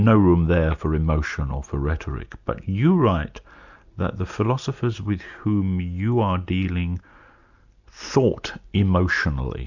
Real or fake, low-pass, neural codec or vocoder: real; 7.2 kHz; none